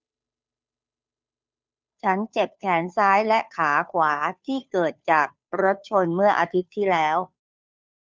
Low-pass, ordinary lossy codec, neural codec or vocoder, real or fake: none; none; codec, 16 kHz, 2 kbps, FunCodec, trained on Chinese and English, 25 frames a second; fake